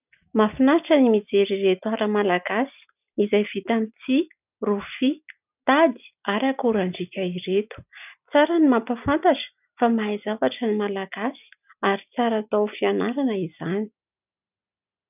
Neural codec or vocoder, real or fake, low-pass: none; real; 3.6 kHz